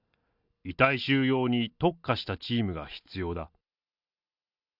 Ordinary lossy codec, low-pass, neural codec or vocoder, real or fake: none; 5.4 kHz; none; real